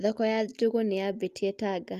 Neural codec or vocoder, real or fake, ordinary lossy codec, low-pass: none; real; Opus, 32 kbps; 14.4 kHz